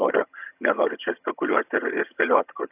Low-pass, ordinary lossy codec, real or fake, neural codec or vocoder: 3.6 kHz; AAC, 32 kbps; fake; vocoder, 22.05 kHz, 80 mel bands, HiFi-GAN